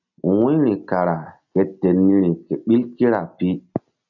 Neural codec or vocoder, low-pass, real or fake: none; 7.2 kHz; real